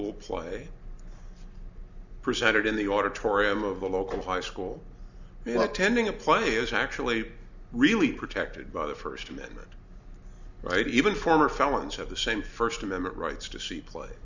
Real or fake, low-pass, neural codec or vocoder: real; 7.2 kHz; none